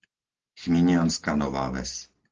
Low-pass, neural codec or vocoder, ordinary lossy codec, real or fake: 7.2 kHz; none; Opus, 16 kbps; real